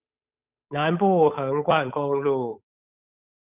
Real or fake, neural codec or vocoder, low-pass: fake; codec, 16 kHz, 8 kbps, FunCodec, trained on Chinese and English, 25 frames a second; 3.6 kHz